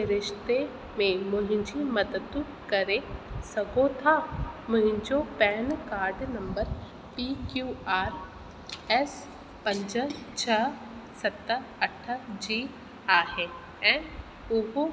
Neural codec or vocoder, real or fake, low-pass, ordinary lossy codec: none; real; none; none